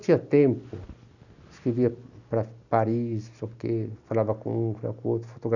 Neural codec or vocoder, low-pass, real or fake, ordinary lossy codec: none; 7.2 kHz; real; none